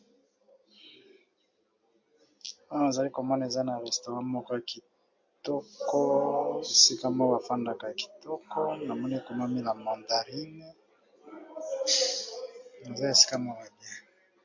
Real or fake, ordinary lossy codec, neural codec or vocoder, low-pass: real; MP3, 48 kbps; none; 7.2 kHz